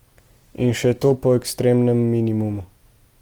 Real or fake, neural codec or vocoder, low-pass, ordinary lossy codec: real; none; 19.8 kHz; Opus, 24 kbps